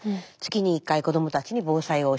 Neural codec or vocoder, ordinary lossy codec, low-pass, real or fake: none; none; none; real